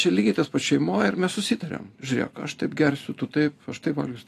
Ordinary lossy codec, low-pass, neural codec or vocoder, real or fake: AAC, 64 kbps; 14.4 kHz; none; real